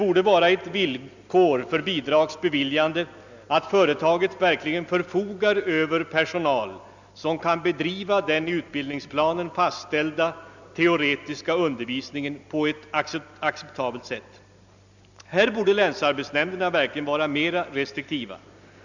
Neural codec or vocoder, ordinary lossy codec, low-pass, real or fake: none; none; 7.2 kHz; real